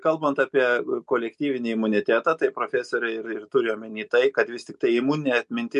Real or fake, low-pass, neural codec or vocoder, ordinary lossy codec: real; 10.8 kHz; none; MP3, 64 kbps